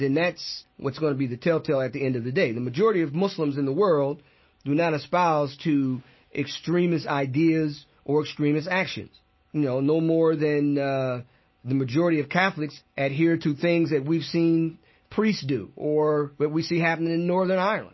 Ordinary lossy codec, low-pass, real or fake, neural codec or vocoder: MP3, 24 kbps; 7.2 kHz; real; none